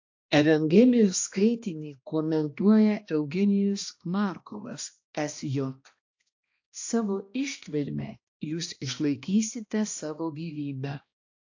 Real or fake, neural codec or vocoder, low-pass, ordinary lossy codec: fake; codec, 16 kHz, 1 kbps, X-Codec, HuBERT features, trained on balanced general audio; 7.2 kHz; AAC, 48 kbps